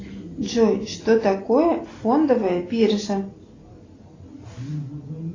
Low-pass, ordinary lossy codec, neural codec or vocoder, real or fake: 7.2 kHz; AAC, 32 kbps; none; real